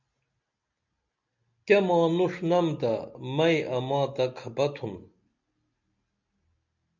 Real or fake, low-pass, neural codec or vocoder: real; 7.2 kHz; none